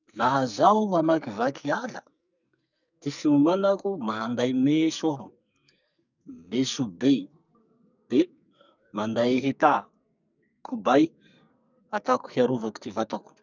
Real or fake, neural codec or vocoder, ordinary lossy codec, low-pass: fake; codec, 44.1 kHz, 2.6 kbps, SNAC; none; 7.2 kHz